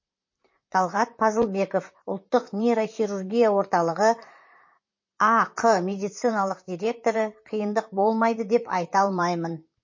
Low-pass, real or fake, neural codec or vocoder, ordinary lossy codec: 7.2 kHz; fake; vocoder, 44.1 kHz, 128 mel bands, Pupu-Vocoder; MP3, 32 kbps